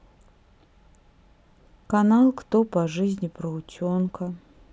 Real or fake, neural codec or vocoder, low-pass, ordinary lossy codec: real; none; none; none